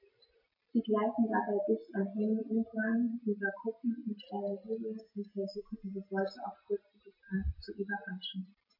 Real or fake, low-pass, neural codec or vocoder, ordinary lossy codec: real; 5.4 kHz; none; MP3, 32 kbps